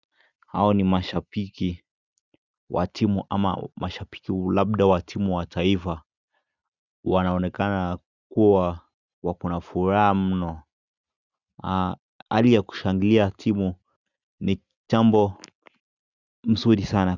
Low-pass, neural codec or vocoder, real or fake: 7.2 kHz; none; real